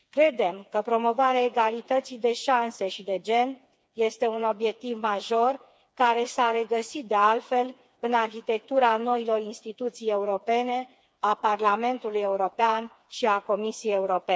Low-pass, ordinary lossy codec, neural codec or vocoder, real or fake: none; none; codec, 16 kHz, 4 kbps, FreqCodec, smaller model; fake